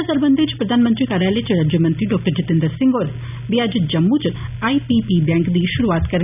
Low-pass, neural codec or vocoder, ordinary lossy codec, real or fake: 3.6 kHz; none; none; real